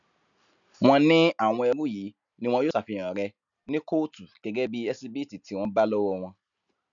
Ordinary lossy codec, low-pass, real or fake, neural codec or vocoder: none; 7.2 kHz; real; none